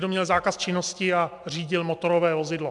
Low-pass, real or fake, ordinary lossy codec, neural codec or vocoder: 10.8 kHz; real; MP3, 96 kbps; none